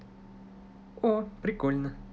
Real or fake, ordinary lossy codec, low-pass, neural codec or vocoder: real; none; none; none